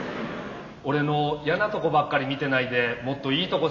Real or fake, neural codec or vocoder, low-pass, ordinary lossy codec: real; none; 7.2 kHz; none